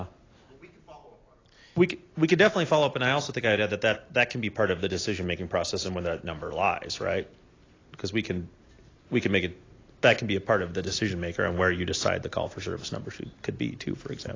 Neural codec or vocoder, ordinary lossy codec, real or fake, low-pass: none; AAC, 32 kbps; real; 7.2 kHz